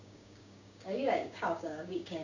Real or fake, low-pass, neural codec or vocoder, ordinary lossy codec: fake; 7.2 kHz; codec, 16 kHz, 6 kbps, DAC; none